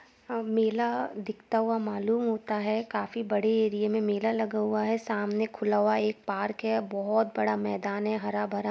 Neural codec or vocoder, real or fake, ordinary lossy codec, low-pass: none; real; none; none